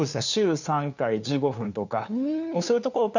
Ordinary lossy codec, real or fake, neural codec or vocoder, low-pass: none; fake; codec, 16 kHz, 2 kbps, FunCodec, trained on LibriTTS, 25 frames a second; 7.2 kHz